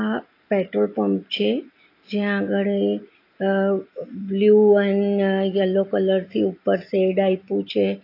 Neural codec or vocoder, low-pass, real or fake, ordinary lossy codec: none; 5.4 kHz; real; AAC, 32 kbps